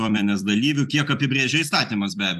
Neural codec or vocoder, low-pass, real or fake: vocoder, 44.1 kHz, 128 mel bands every 256 samples, BigVGAN v2; 14.4 kHz; fake